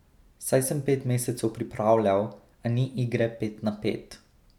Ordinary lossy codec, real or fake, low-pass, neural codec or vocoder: none; real; 19.8 kHz; none